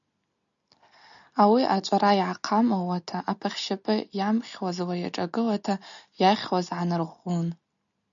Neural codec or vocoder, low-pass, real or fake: none; 7.2 kHz; real